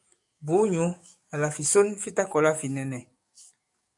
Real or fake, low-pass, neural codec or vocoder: fake; 10.8 kHz; codec, 44.1 kHz, 7.8 kbps, DAC